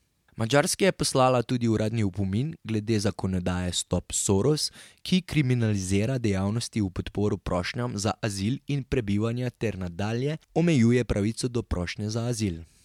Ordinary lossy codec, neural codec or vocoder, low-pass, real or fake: MP3, 96 kbps; none; 19.8 kHz; real